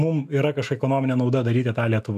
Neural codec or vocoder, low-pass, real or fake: autoencoder, 48 kHz, 128 numbers a frame, DAC-VAE, trained on Japanese speech; 14.4 kHz; fake